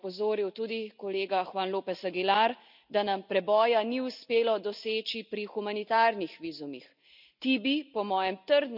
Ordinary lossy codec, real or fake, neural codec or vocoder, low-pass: none; real; none; 5.4 kHz